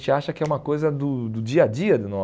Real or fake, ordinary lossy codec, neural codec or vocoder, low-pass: real; none; none; none